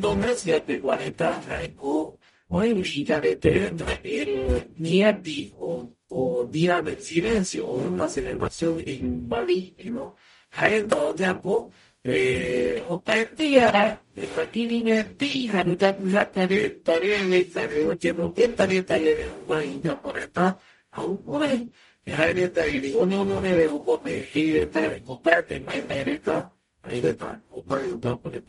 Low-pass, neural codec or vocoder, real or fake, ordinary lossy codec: 19.8 kHz; codec, 44.1 kHz, 0.9 kbps, DAC; fake; MP3, 48 kbps